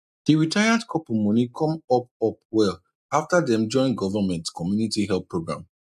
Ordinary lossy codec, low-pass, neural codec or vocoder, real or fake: none; 14.4 kHz; none; real